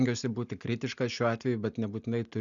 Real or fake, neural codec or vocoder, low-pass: real; none; 7.2 kHz